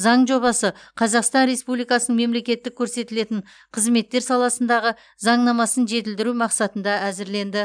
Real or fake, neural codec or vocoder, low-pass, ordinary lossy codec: real; none; 9.9 kHz; none